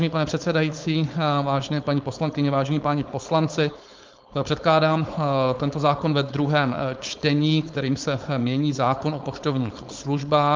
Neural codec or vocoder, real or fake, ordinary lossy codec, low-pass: codec, 16 kHz, 4.8 kbps, FACodec; fake; Opus, 32 kbps; 7.2 kHz